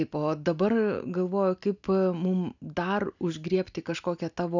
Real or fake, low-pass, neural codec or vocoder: real; 7.2 kHz; none